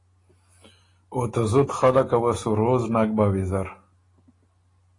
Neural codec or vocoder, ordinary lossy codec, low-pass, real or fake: none; AAC, 32 kbps; 10.8 kHz; real